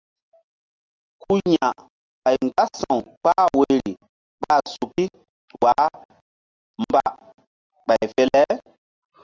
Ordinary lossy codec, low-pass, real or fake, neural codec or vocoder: Opus, 32 kbps; 7.2 kHz; real; none